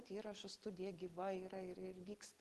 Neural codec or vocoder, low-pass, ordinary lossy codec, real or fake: none; 14.4 kHz; Opus, 16 kbps; real